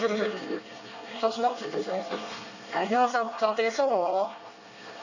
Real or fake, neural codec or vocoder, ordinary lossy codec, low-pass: fake; codec, 24 kHz, 1 kbps, SNAC; none; 7.2 kHz